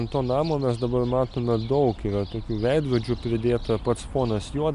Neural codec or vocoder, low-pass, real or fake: none; 10.8 kHz; real